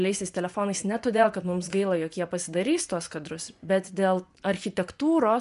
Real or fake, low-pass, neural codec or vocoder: fake; 10.8 kHz; vocoder, 24 kHz, 100 mel bands, Vocos